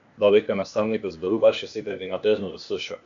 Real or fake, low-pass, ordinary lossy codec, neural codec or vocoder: fake; 7.2 kHz; MP3, 96 kbps; codec, 16 kHz, 0.8 kbps, ZipCodec